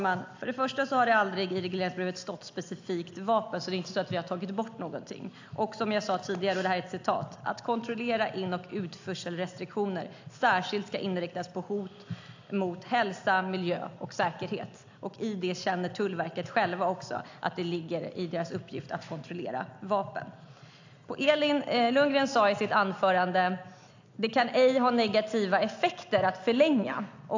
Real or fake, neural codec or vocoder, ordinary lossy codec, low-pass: real; none; AAC, 48 kbps; 7.2 kHz